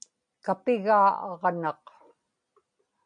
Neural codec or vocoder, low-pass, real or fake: none; 9.9 kHz; real